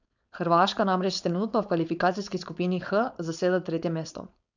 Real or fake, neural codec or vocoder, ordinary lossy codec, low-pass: fake; codec, 16 kHz, 4.8 kbps, FACodec; none; 7.2 kHz